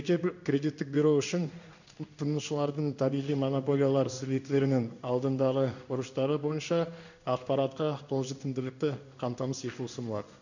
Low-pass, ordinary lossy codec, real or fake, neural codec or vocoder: 7.2 kHz; none; fake; codec, 16 kHz in and 24 kHz out, 1 kbps, XY-Tokenizer